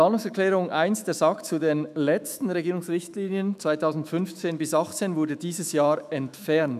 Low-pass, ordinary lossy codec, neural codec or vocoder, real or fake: 14.4 kHz; none; autoencoder, 48 kHz, 128 numbers a frame, DAC-VAE, trained on Japanese speech; fake